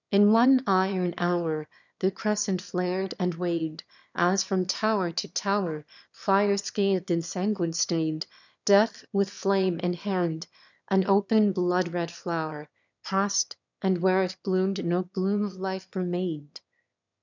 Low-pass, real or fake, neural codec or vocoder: 7.2 kHz; fake; autoencoder, 22.05 kHz, a latent of 192 numbers a frame, VITS, trained on one speaker